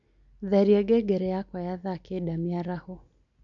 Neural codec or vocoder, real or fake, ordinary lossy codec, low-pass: none; real; none; 7.2 kHz